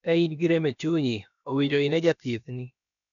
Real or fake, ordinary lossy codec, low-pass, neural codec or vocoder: fake; none; 7.2 kHz; codec, 16 kHz, about 1 kbps, DyCAST, with the encoder's durations